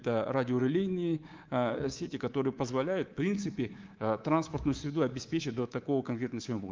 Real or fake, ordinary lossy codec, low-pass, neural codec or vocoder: fake; Opus, 24 kbps; 7.2 kHz; codec, 24 kHz, 3.1 kbps, DualCodec